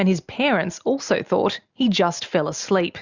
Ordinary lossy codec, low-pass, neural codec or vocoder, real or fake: Opus, 64 kbps; 7.2 kHz; none; real